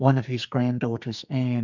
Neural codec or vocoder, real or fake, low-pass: codec, 44.1 kHz, 2.6 kbps, SNAC; fake; 7.2 kHz